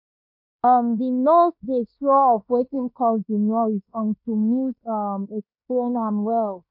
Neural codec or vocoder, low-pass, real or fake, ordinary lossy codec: codec, 16 kHz in and 24 kHz out, 0.9 kbps, LongCat-Audio-Codec, fine tuned four codebook decoder; 5.4 kHz; fake; MP3, 48 kbps